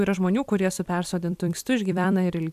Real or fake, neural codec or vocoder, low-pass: fake; vocoder, 44.1 kHz, 128 mel bands every 256 samples, BigVGAN v2; 14.4 kHz